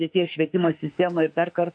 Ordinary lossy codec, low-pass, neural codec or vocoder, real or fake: MP3, 64 kbps; 9.9 kHz; autoencoder, 48 kHz, 32 numbers a frame, DAC-VAE, trained on Japanese speech; fake